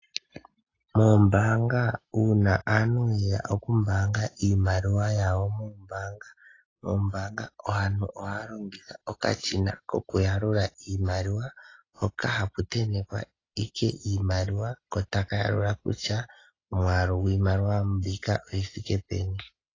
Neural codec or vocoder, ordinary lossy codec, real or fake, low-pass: none; AAC, 32 kbps; real; 7.2 kHz